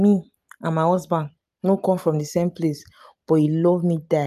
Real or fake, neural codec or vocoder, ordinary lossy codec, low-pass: real; none; none; 14.4 kHz